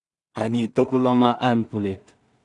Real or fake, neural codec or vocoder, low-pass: fake; codec, 16 kHz in and 24 kHz out, 0.4 kbps, LongCat-Audio-Codec, two codebook decoder; 10.8 kHz